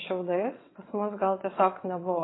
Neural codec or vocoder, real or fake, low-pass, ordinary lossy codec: vocoder, 22.05 kHz, 80 mel bands, WaveNeXt; fake; 7.2 kHz; AAC, 16 kbps